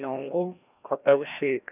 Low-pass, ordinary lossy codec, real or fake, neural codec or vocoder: 3.6 kHz; none; fake; codec, 16 kHz, 1 kbps, FreqCodec, larger model